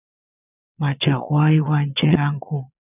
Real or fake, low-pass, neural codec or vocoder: fake; 3.6 kHz; vocoder, 22.05 kHz, 80 mel bands, Vocos